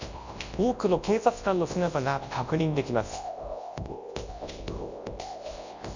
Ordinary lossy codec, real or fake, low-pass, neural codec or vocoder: Opus, 64 kbps; fake; 7.2 kHz; codec, 24 kHz, 0.9 kbps, WavTokenizer, large speech release